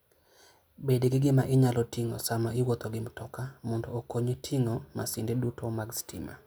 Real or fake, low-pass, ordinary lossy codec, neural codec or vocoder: real; none; none; none